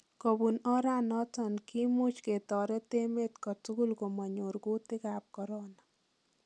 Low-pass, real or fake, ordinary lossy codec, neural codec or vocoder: none; real; none; none